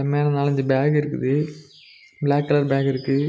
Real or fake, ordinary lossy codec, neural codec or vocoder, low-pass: real; none; none; none